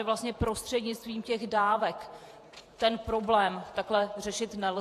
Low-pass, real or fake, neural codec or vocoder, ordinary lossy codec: 14.4 kHz; fake; vocoder, 48 kHz, 128 mel bands, Vocos; AAC, 64 kbps